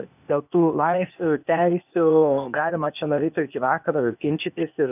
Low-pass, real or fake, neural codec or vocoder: 3.6 kHz; fake; codec, 16 kHz, 0.8 kbps, ZipCodec